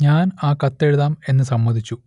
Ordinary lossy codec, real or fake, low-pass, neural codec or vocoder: none; real; 10.8 kHz; none